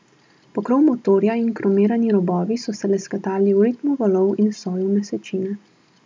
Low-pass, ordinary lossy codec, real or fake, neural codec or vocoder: none; none; real; none